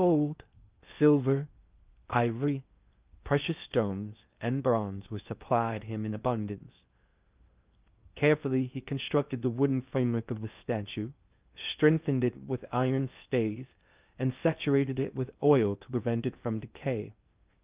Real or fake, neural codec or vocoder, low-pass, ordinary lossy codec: fake; codec, 16 kHz in and 24 kHz out, 0.6 kbps, FocalCodec, streaming, 2048 codes; 3.6 kHz; Opus, 24 kbps